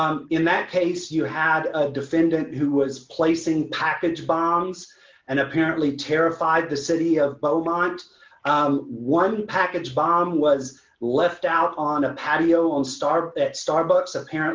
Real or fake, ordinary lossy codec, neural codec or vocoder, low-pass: real; Opus, 32 kbps; none; 7.2 kHz